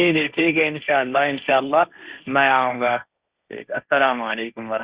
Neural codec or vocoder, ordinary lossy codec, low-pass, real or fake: codec, 16 kHz, 1.1 kbps, Voila-Tokenizer; Opus, 64 kbps; 3.6 kHz; fake